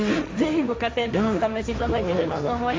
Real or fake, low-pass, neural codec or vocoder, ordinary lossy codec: fake; none; codec, 16 kHz, 1.1 kbps, Voila-Tokenizer; none